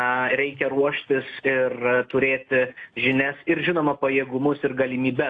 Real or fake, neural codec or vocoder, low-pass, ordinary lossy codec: real; none; 9.9 kHz; AAC, 48 kbps